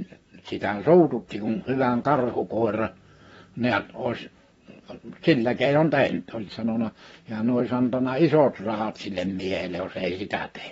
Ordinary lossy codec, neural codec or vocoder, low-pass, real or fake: AAC, 24 kbps; vocoder, 44.1 kHz, 128 mel bands, Pupu-Vocoder; 19.8 kHz; fake